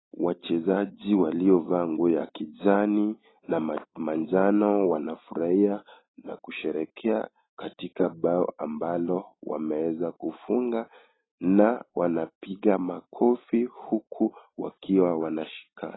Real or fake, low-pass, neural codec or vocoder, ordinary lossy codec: real; 7.2 kHz; none; AAC, 16 kbps